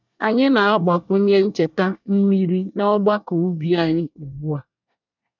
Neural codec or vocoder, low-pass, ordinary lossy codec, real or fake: codec, 24 kHz, 1 kbps, SNAC; 7.2 kHz; none; fake